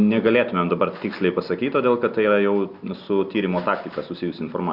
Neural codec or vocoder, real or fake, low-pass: none; real; 5.4 kHz